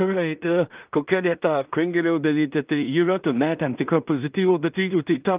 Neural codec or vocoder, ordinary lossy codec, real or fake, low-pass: codec, 16 kHz in and 24 kHz out, 0.4 kbps, LongCat-Audio-Codec, two codebook decoder; Opus, 24 kbps; fake; 3.6 kHz